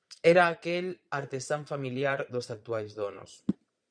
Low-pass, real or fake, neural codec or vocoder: 9.9 kHz; fake; vocoder, 22.05 kHz, 80 mel bands, Vocos